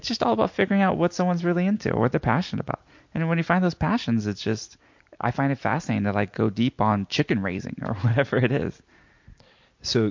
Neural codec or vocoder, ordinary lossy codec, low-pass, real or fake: none; MP3, 48 kbps; 7.2 kHz; real